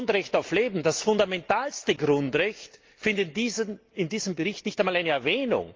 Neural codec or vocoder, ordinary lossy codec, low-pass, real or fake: none; Opus, 32 kbps; 7.2 kHz; real